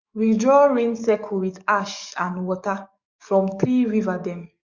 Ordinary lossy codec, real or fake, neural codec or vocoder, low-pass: Opus, 64 kbps; fake; codec, 44.1 kHz, 7.8 kbps, DAC; 7.2 kHz